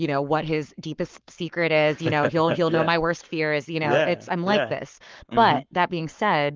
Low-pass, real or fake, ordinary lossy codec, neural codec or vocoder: 7.2 kHz; fake; Opus, 32 kbps; codec, 44.1 kHz, 7.8 kbps, Pupu-Codec